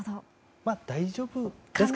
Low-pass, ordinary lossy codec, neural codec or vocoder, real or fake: none; none; none; real